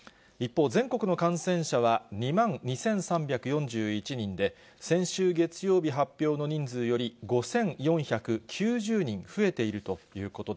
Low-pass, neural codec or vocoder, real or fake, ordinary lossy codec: none; none; real; none